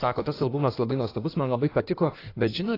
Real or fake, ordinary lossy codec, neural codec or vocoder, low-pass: fake; AAC, 32 kbps; codec, 16 kHz in and 24 kHz out, 1.1 kbps, FireRedTTS-2 codec; 5.4 kHz